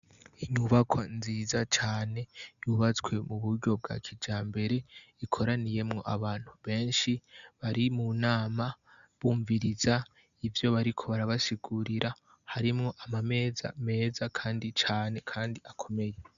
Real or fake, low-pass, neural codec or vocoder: real; 7.2 kHz; none